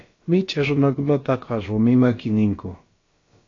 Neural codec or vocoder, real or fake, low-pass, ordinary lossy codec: codec, 16 kHz, about 1 kbps, DyCAST, with the encoder's durations; fake; 7.2 kHz; AAC, 32 kbps